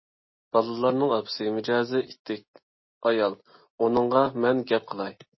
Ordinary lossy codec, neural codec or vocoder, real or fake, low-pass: MP3, 24 kbps; none; real; 7.2 kHz